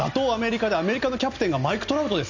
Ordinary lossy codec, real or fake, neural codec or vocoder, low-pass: none; real; none; 7.2 kHz